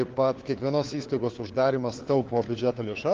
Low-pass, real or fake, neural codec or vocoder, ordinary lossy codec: 7.2 kHz; fake; codec, 16 kHz, 4 kbps, FunCodec, trained on LibriTTS, 50 frames a second; Opus, 16 kbps